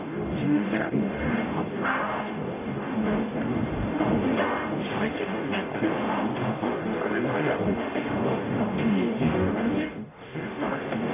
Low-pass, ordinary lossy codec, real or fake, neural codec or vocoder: 3.6 kHz; none; fake; codec, 44.1 kHz, 0.9 kbps, DAC